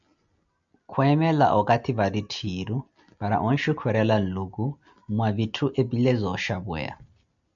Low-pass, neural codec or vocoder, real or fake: 7.2 kHz; none; real